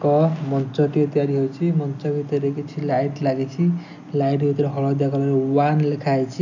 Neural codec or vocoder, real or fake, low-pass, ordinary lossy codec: none; real; 7.2 kHz; none